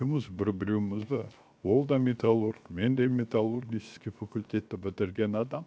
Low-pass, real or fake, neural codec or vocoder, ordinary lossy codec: none; fake; codec, 16 kHz, 0.7 kbps, FocalCodec; none